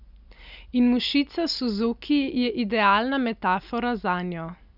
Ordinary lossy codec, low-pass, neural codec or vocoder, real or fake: none; 5.4 kHz; none; real